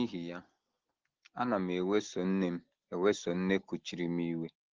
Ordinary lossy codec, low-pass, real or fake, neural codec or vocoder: Opus, 16 kbps; 7.2 kHz; real; none